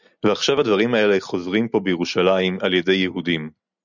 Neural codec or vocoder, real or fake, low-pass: none; real; 7.2 kHz